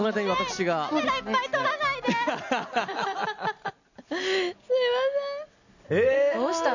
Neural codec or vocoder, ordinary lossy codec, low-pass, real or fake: none; none; 7.2 kHz; real